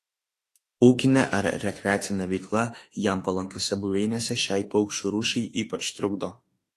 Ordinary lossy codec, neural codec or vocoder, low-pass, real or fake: AAC, 48 kbps; autoencoder, 48 kHz, 32 numbers a frame, DAC-VAE, trained on Japanese speech; 14.4 kHz; fake